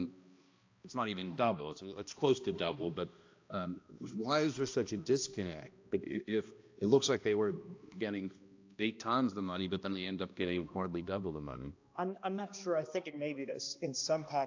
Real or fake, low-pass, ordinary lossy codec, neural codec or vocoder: fake; 7.2 kHz; AAC, 48 kbps; codec, 16 kHz, 1 kbps, X-Codec, HuBERT features, trained on balanced general audio